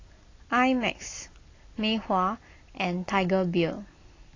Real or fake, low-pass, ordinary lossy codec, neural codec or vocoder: real; 7.2 kHz; AAC, 32 kbps; none